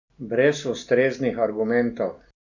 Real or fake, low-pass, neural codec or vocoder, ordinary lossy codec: real; 7.2 kHz; none; none